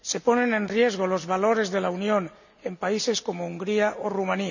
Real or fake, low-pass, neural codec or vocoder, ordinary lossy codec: real; 7.2 kHz; none; none